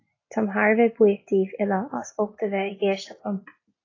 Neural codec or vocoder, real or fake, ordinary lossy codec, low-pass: none; real; AAC, 32 kbps; 7.2 kHz